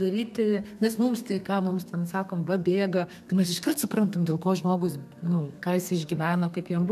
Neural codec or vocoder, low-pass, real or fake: codec, 32 kHz, 1.9 kbps, SNAC; 14.4 kHz; fake